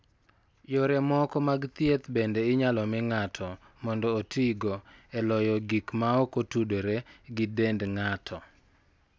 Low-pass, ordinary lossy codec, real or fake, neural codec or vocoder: none; none; real; none